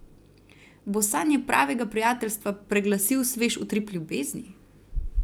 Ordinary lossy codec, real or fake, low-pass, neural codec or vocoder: none; fake; none; vocoder, 44.1 kHz, 128 mel bands every 256 samples, BigVGAN v2